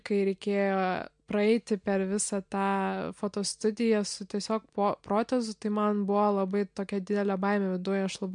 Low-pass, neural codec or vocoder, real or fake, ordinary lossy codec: 9.9 kHz; none; real; MP3, 64 kbps